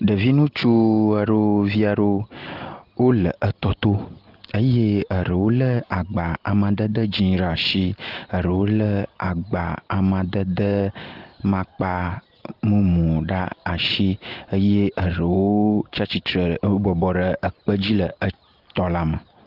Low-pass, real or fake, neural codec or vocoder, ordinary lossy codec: 5.4 kHz; real; none; Opus, 16 kbps